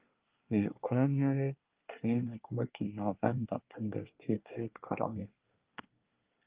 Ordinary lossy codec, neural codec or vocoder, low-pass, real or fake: Opus, 32 kbps; codec, 24 kHz, 1 kbps, SNAC; 3.6 kHz; fake